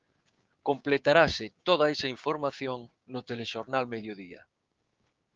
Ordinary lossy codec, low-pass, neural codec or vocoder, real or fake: Opus, 32 kbps; 7.2 kHz; codec, 16 kHz, 6 kbps, DAC; fake